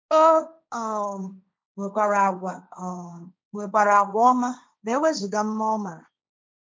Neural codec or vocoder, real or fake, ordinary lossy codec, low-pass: codec, 16 kHz, 1.1 kbps, Voila-Tokenizer; fake; none; none